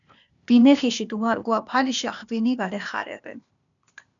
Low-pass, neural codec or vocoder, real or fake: 7.2 kHz; codec, 16 kHz, 0.8 kbps, ZipCodec; fake